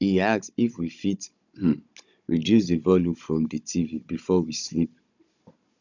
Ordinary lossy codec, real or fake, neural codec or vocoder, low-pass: none; fake; codec, 16 kHz, 16 kbps, FunCodec, trained on Chinese and English, 50 frames a second; 7.2 kHz